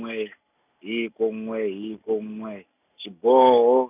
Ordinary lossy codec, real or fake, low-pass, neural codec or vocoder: none; real; 3.6 kHz; none